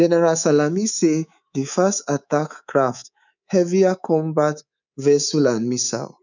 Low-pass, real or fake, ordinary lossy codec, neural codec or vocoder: 7.2 kHz; fake; none; codec, 24 kHz, 3.1 kbps, DualCodec